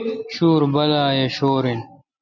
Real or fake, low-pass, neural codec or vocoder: real; 7.2 kHz; none